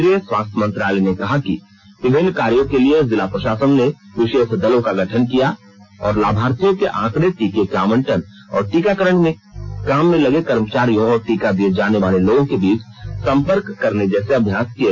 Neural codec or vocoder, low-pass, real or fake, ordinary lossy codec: none; 7.2 kHz; real; none